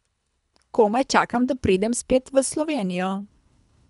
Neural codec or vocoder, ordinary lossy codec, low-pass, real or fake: codec, 24 kHz, 3 kbps, HILCodec; none; 10.8 kHz; fake